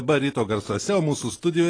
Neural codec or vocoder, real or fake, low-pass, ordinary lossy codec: none; real; 9.9 kHz; AAC, 32 kbps